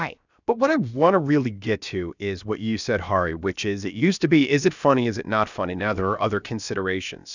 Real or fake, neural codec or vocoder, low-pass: fake; codec, 16 kHz, about 1 kbps, DyCAST, with the encoder's durations; 7.2 kHz